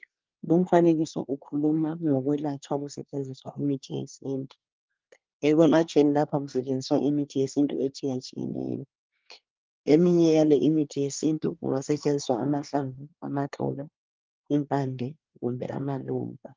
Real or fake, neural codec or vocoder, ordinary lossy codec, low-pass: fake; codec, 24 kHz, 1 kbps, SNAC; Opus, 24 kbps; 7.2 kHz